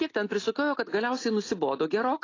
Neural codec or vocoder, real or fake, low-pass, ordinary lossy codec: none; real; 7.2 kHz; AAC, 32 kbps